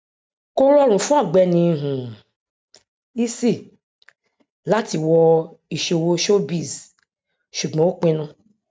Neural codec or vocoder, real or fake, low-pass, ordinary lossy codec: none; real; none; none